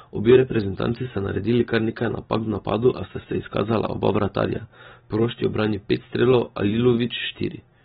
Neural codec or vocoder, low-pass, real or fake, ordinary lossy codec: none; 7.2 kHz; real; AAC, 16 kbps